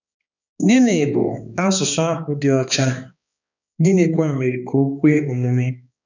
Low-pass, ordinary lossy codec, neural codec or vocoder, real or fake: 7.2 kHz; none; codec, 16 kHz, 2 kbps, X-Codec, HuBERT features, trained on balanced general audio; fake